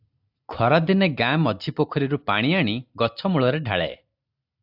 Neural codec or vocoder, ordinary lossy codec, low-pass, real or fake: none; AAC, 48 kbps; 5.4 kHz; real